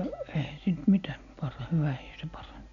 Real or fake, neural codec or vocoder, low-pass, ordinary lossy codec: real; none; 7.2 kHz; none